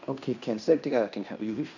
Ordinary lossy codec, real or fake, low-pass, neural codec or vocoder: none; fake; 7.2 kHz; codec, 16 kHz in and 24 kHz out, 0.9 kbps, LongCat-Audio-Codec, fine tuned four codebook decoder